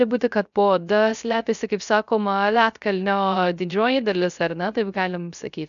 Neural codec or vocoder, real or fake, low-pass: codec, 16 kHz, 0.3 kbps, FocalCodec; fake; 7.2 kHz